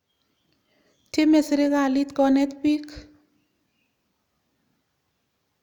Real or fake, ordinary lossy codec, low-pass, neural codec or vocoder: real; none; 19.8 kHz; none